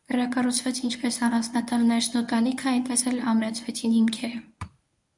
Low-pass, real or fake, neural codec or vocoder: 10.8 kHz; fake; codec, 24 kHz, 0.9 kbps, WavTokenizer, medium speech release version 1